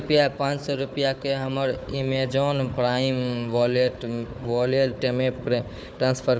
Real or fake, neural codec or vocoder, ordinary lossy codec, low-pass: fake; codec, 16 kHz, 4 kbps, FunCodec, trained on Chinese and English, 50 frames a second; none; none